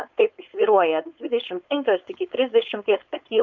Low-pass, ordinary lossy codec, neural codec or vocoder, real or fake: 7.2 kHz; Opus, 64 kbps; codec, 16 kHz, 4.8 kbps, FACodec; fake